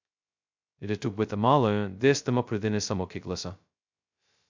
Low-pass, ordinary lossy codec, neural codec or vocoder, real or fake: 7.2 kHz; MP3, 64 kbps; codec, 16 kHz, 0.2 kbps, FocalCodec; fake